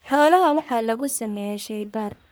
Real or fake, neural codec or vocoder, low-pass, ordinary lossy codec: fake; codec, 44.1 kHz, 1.7 kbps, Pupu-Codec; none; none